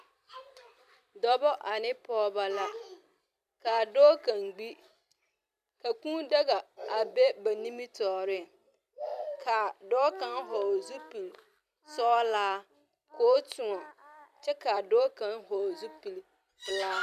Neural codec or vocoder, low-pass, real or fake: vocoder, 44.1 kHz, 128 mel bands every 256 samples, BigVGAN v2; 14.4 kHz; fake